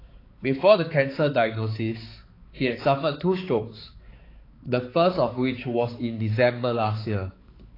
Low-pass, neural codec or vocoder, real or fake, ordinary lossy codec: 5.4 kHz; codec, 16 kHz, 4 kbps, X-Codec, HuBERT features, trained on balanced general audio; fake; AAC, 24 kbps